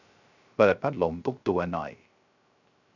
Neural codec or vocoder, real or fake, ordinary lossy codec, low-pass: codec, 16 kHz, 0.3 kbps, FocalCodec; fake; none; 7.2 kHz